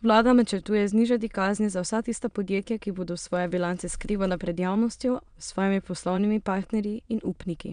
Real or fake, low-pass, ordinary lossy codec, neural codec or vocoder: fake; 9.9 kHz; none; autoencoder, 22.05 kHz, a latent of 192 numbers a frame, VITS, trained on many speakers